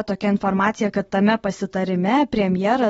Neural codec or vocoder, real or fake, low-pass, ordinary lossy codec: none; real; 10.8 kHz; AAC, 24 kbps